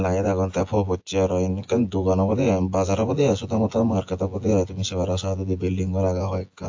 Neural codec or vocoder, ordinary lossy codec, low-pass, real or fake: vocoder, 24 kHz, 100 mel bands, Vocos; AAC, 48 kbps; 7.2 kHz; fake